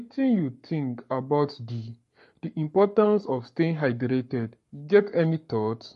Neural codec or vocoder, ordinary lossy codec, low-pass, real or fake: codec, 44.1 kHz, 7.8 kbps, Pupu-Codec; MP3, 48 kbps; 14.4 kHz; fake